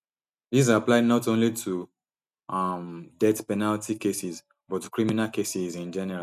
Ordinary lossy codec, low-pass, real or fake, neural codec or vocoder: none; 14.4 kHz; real; none